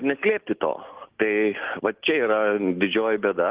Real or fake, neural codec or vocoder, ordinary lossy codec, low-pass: real; none; Opus, 16 kbps; 3.6 kHz